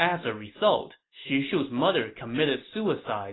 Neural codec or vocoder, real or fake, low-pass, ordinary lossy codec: none; real; 7.2 kHz; AAC, 16 kbps